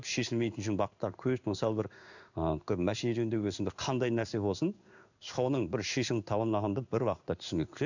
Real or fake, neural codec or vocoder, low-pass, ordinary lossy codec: fake; codec, 16 kHz in and 24 kHz out, 1 kbps, XY-Tokenizer; 7.2 kHz; none